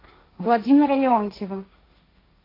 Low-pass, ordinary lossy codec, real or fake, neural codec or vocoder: 5.4 kHz; AAC, 24 kbps; fake; codec, 16 kHz, 1.1 kbps, Voila-Tokenizer